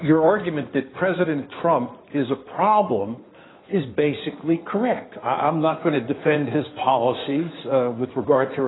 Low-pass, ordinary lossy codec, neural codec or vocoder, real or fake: 7.2 kHz; AAC, 16 kbps; codec, 16 kHz in and 24 kHz out, 2.2 kbps, FireRedTTS-2 codec; fake